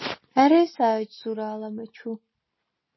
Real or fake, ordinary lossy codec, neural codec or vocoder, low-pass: real; MP3, 24 kbps; none; 7.2 kHz